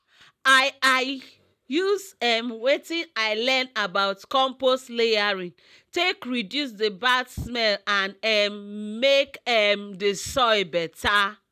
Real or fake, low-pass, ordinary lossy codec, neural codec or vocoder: real; 14.4 kHz; none; none